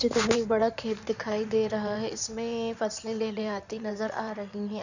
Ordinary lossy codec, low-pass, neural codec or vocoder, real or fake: none; 7.2 kHz; codec, 16 kHz in and 24 kHz out, 2.2 kbps, FireRedTTS-2 codec; fake